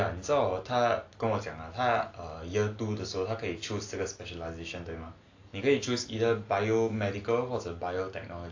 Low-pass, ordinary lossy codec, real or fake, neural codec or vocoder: 7.2 kHz; AAC, 48 kbps; real; none